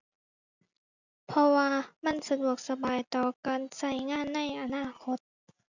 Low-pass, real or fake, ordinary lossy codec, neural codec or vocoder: 7.2 kHz; real; none; none